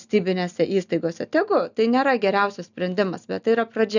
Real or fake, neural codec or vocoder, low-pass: real; none; 7.2 kHz